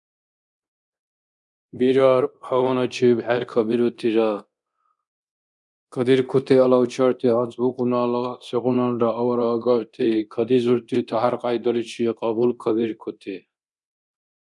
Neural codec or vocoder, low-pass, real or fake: codec, 24 kHz, 0.9 kbps, DualCodec; 10.8 kHz; fake